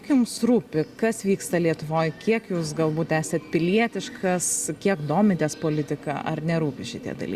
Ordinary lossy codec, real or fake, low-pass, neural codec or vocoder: Opus, 64 kbps; real; 14.4 kHz; none